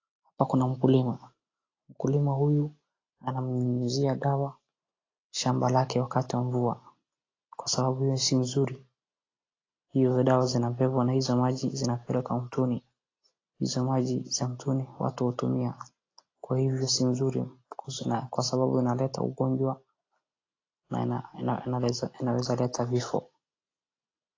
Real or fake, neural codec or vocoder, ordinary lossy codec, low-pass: real; none; AAC, 32 kbps; 7.2 kHz